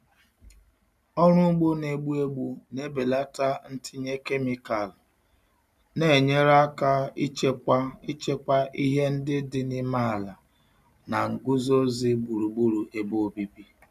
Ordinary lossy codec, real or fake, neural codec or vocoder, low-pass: none; real; none; 14.4 kHz